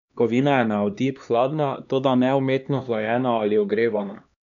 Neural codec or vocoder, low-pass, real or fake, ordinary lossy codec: codec, 16 kHz, 2 kbps, X-Codec, HuBERT features, trained on LibriSpeech; 7.2 kHz; fake; none